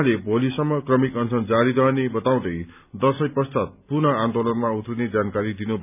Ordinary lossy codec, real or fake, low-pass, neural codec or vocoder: none; real; 3.6 kHz; none